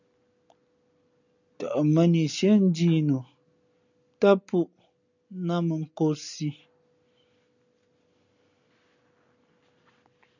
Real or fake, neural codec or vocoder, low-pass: real; none; 7.2 kHz